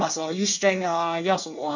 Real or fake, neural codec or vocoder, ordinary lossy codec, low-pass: fake; codec, 24 kHz, 1 kbps, SNAC; none; 7.2 kHz